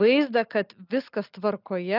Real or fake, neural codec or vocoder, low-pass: real; none; 5.4 kHz